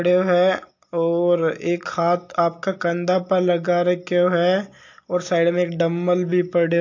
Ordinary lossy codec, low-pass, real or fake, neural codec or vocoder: AAC, 48 kbps; 7.2 kHz; real; none